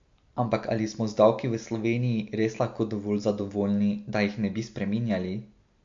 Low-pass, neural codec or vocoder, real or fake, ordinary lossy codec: 7.2 kHz; none; real; MP3, 48 kbps